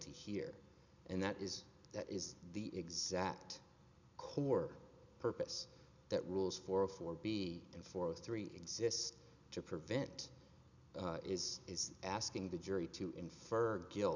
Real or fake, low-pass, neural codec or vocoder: real; 7.2 kHz; none